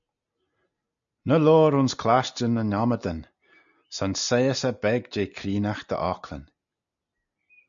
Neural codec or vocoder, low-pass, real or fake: none; 7.2 kHz; real